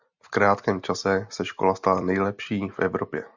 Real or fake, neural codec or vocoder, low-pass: real; none; 7.2 kHz